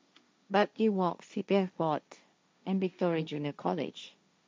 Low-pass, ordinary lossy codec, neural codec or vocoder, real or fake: none; none; codec, 16 kHz, 1.1 kbps, Voila-Tokenizer; fake